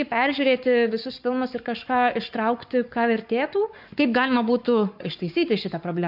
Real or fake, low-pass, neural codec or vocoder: fake; 5.4 kHz; codec, 44.1 kHz, 7.8 kbps, Pupu-Codec